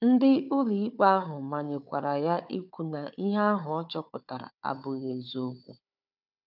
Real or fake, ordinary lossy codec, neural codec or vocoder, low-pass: fake; none; codec, 16 kHz, 4 kbps, FunCodec, trained on Chinese and English, 50 frames a second; 5.4 kHz